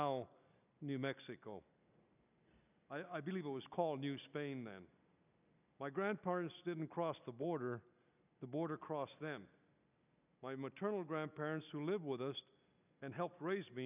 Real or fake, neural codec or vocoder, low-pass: real; none; 3.6 kHz